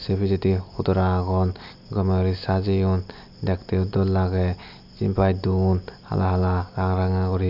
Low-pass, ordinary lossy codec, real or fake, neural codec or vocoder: 5.4 kHz; none; real; none